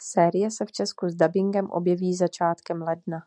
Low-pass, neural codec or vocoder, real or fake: 9.9 kHz; none; real